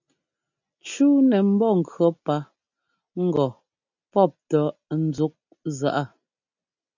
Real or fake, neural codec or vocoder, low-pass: real; none; 7.2 kHz